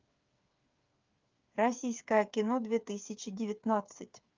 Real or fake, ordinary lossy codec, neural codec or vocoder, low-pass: fake; Opus, 24 kbps; codec, 16 kHz, 8 kbps, FreqCodec, smaller model; 7.2 kHz